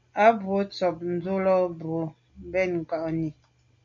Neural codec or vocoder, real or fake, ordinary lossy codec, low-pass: none; real; MP3, 64 kbps; 7.2 kHz